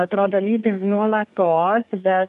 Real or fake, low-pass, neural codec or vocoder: fake; 10.8 kHz; codec, 24 kHz, 1 kbps, SNAC